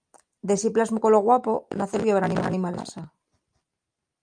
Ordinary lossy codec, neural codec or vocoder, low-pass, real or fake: Opus, 32 kbps; none; 9.9 kHz; real